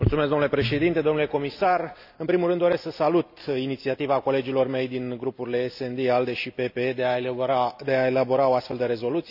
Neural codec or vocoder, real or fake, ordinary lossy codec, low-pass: none; real; none; 5.4 kHz